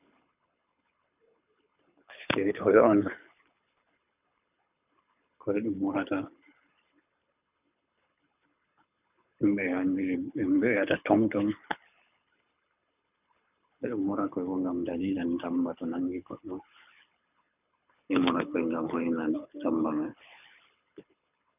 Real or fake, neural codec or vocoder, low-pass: fake; codec, 24 kHz, 6 kbps, HILCodec; 3.6 kHz